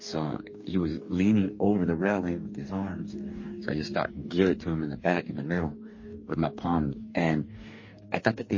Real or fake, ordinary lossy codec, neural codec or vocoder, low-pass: fake; MP3, 32 kbps; codec, 44.1 kHz, 2.6 kbps, DAC; 7.2 kHz